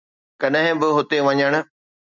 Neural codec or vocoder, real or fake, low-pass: none; real; 7.2 kHz